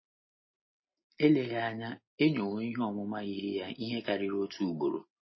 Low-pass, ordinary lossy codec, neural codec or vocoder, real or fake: 7.2 kHz; MP3, 24 kbps; none; real